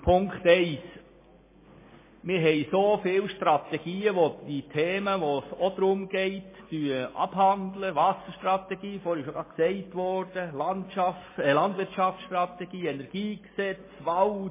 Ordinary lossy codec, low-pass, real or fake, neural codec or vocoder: MP3, 16 kbps; 3.6 kHz; real; none